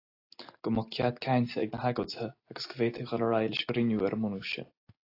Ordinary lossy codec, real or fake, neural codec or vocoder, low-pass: AAC, 32 kbps; real; none; 5.4 kHz